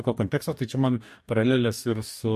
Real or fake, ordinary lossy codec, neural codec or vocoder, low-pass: fake; MP3, 64 kbps; codec, 44.1 kHz, 2.6 kbps, DAC; 14.4 kHz